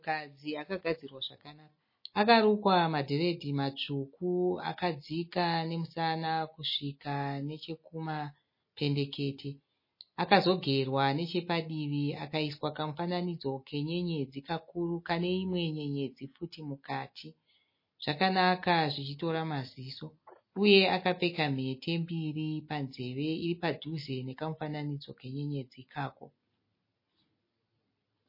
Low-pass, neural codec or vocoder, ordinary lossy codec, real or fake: 5.4 kHz; none; MP3, 24 kbps; real